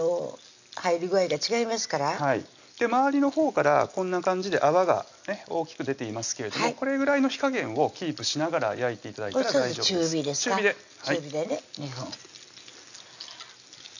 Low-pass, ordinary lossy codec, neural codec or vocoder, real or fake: 7.2 kHz; none; none; real